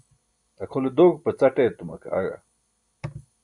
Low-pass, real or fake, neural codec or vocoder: 10.8 kHz; real; none